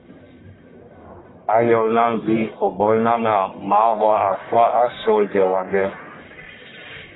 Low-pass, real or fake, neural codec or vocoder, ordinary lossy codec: 7.2 kHz; fake; codec, 44.1 kHz, 1.7 kbps, Pupu-Codec; AAC, 16 kbps